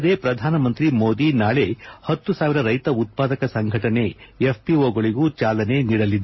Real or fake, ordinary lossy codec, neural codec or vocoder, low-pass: real; MP3, 24 kbps; none; 7.2 kHz